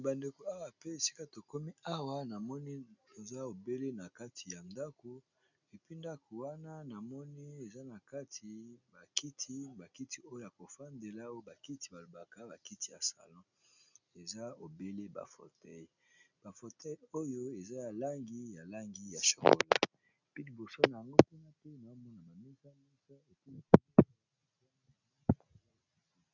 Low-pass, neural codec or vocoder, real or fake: 7.2 kHz; none; real